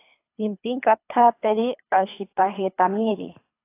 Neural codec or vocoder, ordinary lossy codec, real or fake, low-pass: codec, 24 kHz, 3 kbps, HILCodec; AAC, 24 kbps; fake; 3.6 kHz